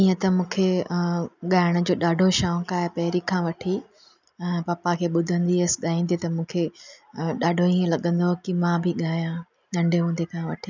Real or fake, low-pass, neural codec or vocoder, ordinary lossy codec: real; 7.2 kHz; none; none